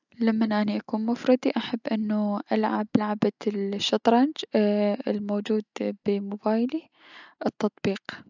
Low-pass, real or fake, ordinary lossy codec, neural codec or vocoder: 7.2 kHz; real; none; none